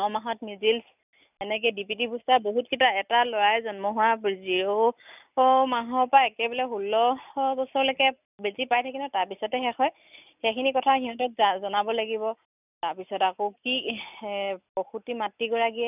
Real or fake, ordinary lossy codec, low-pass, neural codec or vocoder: real; none; 3.6 kHz; none